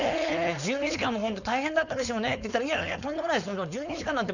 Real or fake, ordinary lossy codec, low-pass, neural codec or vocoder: fake; none; 7.2 kHz; codec, 16 kHz, 4.8 kbps, FACodec